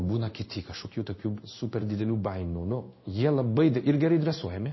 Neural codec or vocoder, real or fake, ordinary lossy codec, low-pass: codec, 16 kHz in and 24 kHz out, 1 kbps, XY-Tokenizer; fake; MP3, 24 kbps; 7.2 kHz